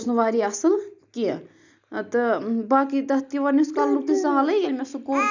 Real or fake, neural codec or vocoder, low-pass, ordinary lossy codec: real; none; 7.2 kHz; none